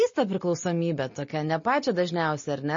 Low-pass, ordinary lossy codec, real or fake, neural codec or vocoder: 7.2 kHz; MP3, 32 kbps; real; none